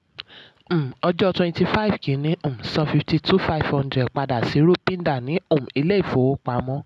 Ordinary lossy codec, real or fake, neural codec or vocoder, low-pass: none; real; none; none